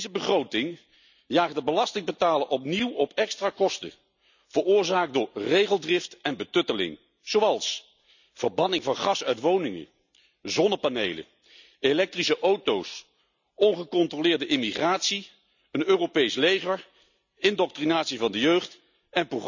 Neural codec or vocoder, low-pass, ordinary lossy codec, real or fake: none; 7.2 kHz; none; real